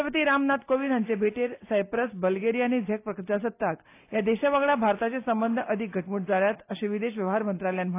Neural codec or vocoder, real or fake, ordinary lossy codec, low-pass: none; real; AAC, 24 kbps; 3.6 kHz